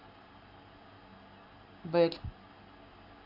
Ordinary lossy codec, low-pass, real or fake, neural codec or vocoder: AAC, 48 kbps; 5.4 kHz; real; none